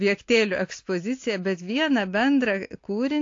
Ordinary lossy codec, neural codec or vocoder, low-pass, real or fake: AAC, 48 kbps; none; 7.2 kHz; real